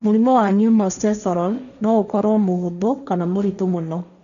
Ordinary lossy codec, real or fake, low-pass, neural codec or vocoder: none; fake; 7.2 kHz; codec, 16 kHz, 1.1 kbps, Voila-Tokenizer